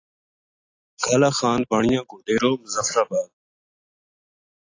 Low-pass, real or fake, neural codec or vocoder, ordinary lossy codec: 7.2 kHz; real; none; AAC, 32 kbps